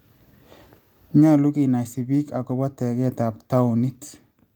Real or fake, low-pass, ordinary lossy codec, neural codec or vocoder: real; 19.8 kHz; Opus, 32 kbps; none